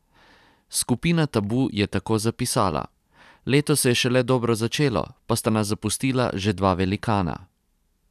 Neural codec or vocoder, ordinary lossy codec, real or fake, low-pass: none; none; real; 14.4 kHz